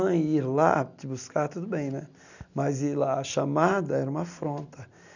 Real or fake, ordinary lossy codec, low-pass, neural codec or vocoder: real; none; 7.2 kHz; none